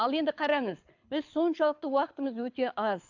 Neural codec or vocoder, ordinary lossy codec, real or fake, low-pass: codec, 24 kHz, 6 kbps, HILCodec; AAC, 48 kbps; fake; 7.2 kHz